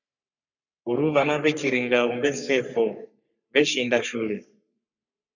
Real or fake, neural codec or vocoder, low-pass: fake; codec, 44.1 kHz, 3.4 kbps, Pupu-Codec; 7.2 kHz